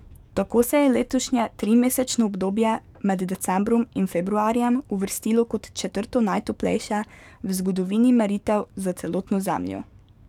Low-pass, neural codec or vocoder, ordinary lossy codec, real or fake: 19.8 kHz; codec, 44.1 kHz, 7.8 kbps, DAC; none; fake